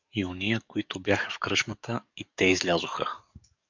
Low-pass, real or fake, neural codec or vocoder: 7.2 kHz; fake; codec, 44.1 kHz, 7.8 kbps, DAC